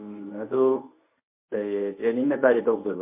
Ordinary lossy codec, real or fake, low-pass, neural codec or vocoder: MP3, 24 kbps; fake; 3.6 kHz; codec, 24 kHz, 0.9 kbps, WavTokenizer, medium speech release version 1